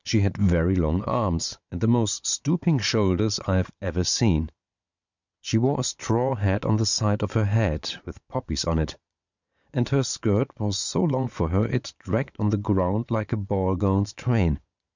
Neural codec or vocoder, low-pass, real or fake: vocoder, 22.05 kHz, 80 mel bands, Vocos; 7.2 kHz; fake